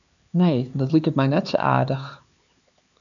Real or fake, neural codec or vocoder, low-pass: fake; codec, 16 kHz, 4 kbps, X-Codec, HuBERT features, trained on LibriSpeech; 7.2 kHz